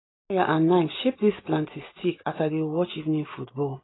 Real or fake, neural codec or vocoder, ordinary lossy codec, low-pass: real; none; AAC, 16 kbps; 7.2 kHz